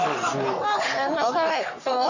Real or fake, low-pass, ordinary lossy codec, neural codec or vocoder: fake; 7.2 kHz; none; codec, 44.1 kHz, 3.4 kbps, Pupu-Codec